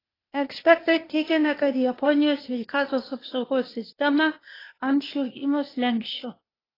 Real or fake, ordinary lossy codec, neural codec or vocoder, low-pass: fake; AAC, 24 kbps; codec, 16 kHz, 0.8 kbps, ZipCodec; 5.4 kHz